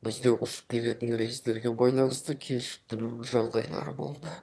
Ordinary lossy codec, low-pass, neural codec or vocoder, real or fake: none; none; autoencoder, 22.05 kHz, a latent of 192 numbers a frame, VITS, trained on one speaker; fake